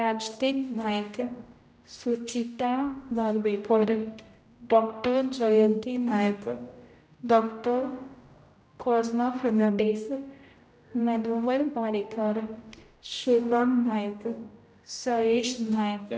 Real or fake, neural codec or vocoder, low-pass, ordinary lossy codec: fake; codec, 16 kHz, 0.5 kbps, X-Codec, HuBERT features, trained on general audio; none; none